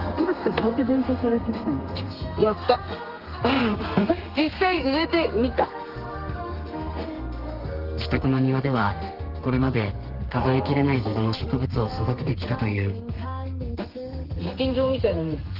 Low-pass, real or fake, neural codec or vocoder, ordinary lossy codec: 5.4 kHz; fake; codec, 32 kHz, 1.9 kbps, SNAC; Opus, 32 kbps